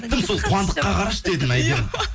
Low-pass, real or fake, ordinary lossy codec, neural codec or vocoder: none; real; none; none